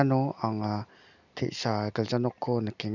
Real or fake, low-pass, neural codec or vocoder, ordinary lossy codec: real; 7.2 kHz; none; none